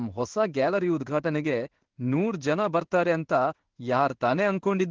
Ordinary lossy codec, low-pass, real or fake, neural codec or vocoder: Opus, 16 kbps; 7.2 kHz; fake; codec, 16 kHz in and 24 kHz out, 1 kbps, XY-Tokenizer